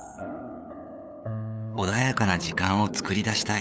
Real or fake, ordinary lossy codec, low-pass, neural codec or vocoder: fake; none; none; codec, 16 kHz, 8 kbps, FunCodec, trained on LibriTTS, 25 frames a second